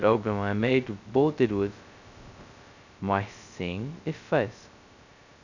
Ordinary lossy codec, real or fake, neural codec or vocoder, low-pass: none; fake; codec, 16 kHz, 0.2 kbps, FocalCodec; 7.2 kHz